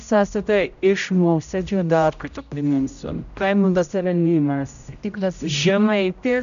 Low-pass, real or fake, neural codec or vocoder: 7.2 kHz; fake; codec, 16 kHz, 0.5 kbps, X-Codec, HuBERT features, trained on general audio